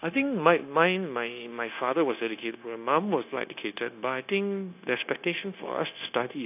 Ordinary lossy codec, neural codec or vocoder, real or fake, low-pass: none; codec, 16 kHz, 0.9 kbps, LongCat-Audio-Codec; fake; 3.6 kHz